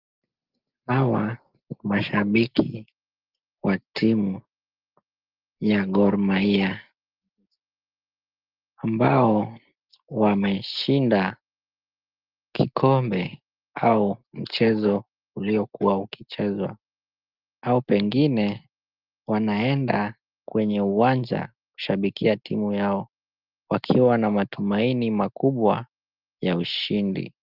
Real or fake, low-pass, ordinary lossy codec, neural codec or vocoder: real; 5.4 kHz; Opus, 32 kbps; none